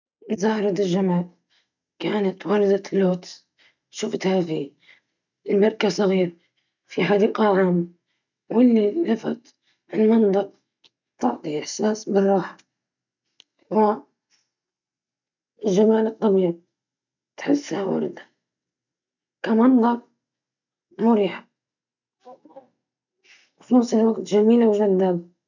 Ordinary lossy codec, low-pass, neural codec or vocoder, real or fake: none; 7.2 kHz; none; real